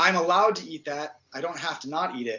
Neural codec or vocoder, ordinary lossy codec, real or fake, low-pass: none; AAC, 48 kbps; real; 7.2 kHz